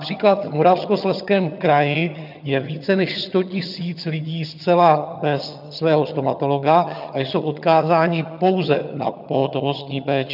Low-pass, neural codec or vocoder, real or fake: 5.4 kHz; vocoder, 22.05 kHz, 80 mel bands, HiFi-GAN; fake